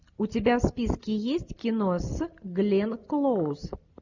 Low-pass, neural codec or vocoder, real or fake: 7.2 kHz; none; real